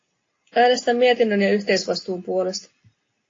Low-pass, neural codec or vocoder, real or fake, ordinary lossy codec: 7.2 kHz; none; real; AAC, 32 kbps